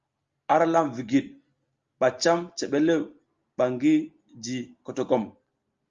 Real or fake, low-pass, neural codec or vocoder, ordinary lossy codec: real; 7.2 kHz; none; Opus, 32 kbps